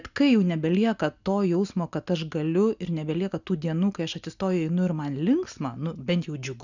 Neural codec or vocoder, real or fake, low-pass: none; real; 7.2 kHz